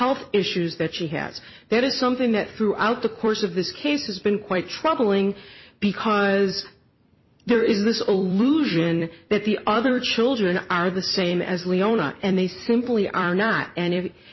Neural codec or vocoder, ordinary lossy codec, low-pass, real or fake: vocoder, 44.1 kHz, 128 mel bands every 256 samples, BigVGAN v2; MP3, 24 kbps; 7.2 kHz; fake